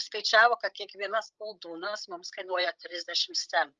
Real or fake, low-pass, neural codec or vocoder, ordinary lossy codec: fake; 7.2 kHz; codec, 16 kHz, 16 kbps, FreqCodec, larger model; Opus, 24 kbps